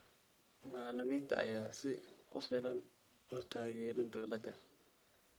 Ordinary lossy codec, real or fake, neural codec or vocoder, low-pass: none; fake; codec, 44.1 kHz, 1.7 kbps, Pupu-Codec; none